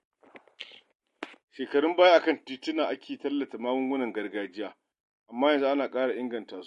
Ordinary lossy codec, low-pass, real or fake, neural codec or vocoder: MP3, 48 kbps; 14.4 kHz; real; none